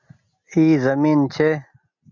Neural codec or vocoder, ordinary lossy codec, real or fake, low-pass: none; MP3, 48 kbps; real; 7.2 kHz